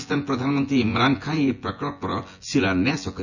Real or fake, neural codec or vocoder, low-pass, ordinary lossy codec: fake; vocoder, 24 kHz, 100 mel bands, Vocos; 7.2 kHz; none